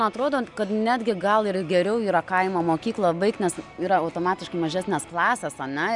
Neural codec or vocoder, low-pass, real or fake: none; 10.8 kHz; real